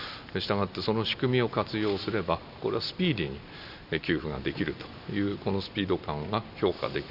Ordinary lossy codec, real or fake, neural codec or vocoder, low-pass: none; real; none; 5.4 kHz